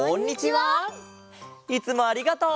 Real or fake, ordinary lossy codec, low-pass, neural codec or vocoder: real; none; none; none